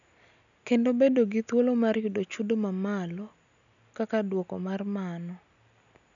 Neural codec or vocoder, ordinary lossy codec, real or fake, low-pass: none; none; real; 7.2 kHz